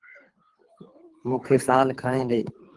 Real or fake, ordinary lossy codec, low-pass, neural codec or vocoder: fake; Opus, 24 kbps; 10.8 kHz; codec, 24 kHz, 3 kbps, HILCodec